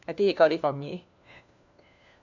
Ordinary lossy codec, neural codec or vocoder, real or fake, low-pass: none; codec, 16 kHz, 1 kbps, FunCodec, trained on LibriTTS, 50 frames a second; fake; 7.2 kHz